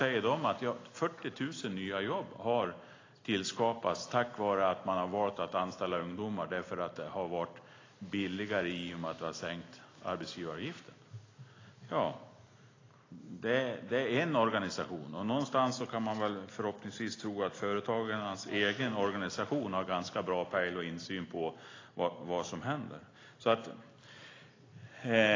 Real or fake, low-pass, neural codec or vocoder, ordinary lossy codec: real; 7.2 kHz; none; AAC, 32 kbps